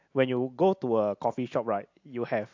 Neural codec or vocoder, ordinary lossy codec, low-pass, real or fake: none; AAC, 48 kbps; 7.2 kHz; real